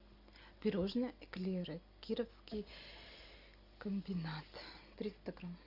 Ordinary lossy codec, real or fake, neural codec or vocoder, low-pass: Opus, 64 kbps; real; none; 5.4 kHz